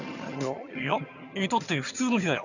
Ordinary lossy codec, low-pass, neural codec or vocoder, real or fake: none; 7.2 kHz; vocoder, 22.05 kHz, 80 mel bands, HiFi-GAN; fake